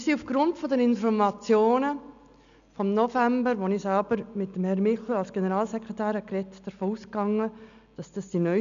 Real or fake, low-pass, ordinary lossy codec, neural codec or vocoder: real; 7.2 kHz; none; none